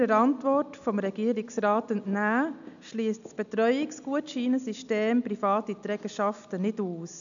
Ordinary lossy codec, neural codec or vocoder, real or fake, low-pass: none; none; real; 7.2 kHz